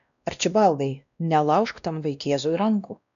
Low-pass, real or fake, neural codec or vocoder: 7.2 kHz; fake; codec, 16 kHz, 1 kbps, X-Codec, WavLM features, trained on Multilingual LibriSpeech